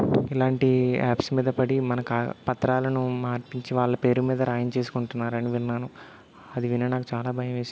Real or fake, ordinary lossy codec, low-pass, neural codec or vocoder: real; none; none; none